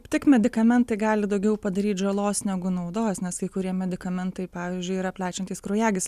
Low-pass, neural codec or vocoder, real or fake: 14.4 kHz; none; real